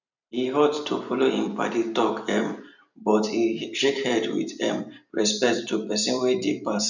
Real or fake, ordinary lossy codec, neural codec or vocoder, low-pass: fake; none; vocoder, 44.1 kHz, 128 mel bands every 256 samples, BigVGAN v2; 7.2 kHz